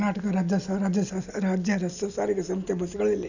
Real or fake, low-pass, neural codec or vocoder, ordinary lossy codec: real; 7.2 kHz; none; none